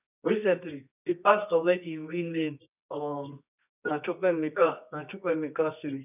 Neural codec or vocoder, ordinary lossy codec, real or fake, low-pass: codec, 24 kHz, 0.9 kbps, WavTokenizer, medium music audio release; none; fake; 3.6 kHz